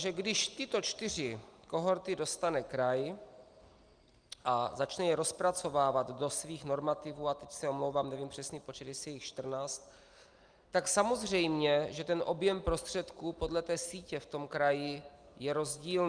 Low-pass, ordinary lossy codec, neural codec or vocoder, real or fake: 9.9 kHz; Opus, 32 kbps; none; real